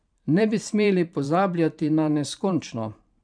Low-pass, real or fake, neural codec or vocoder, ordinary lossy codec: 9.9 kHz; fake; vocoder, 44.1 kHz, 128 mel bands every 512 samples, BigVGAN v2; none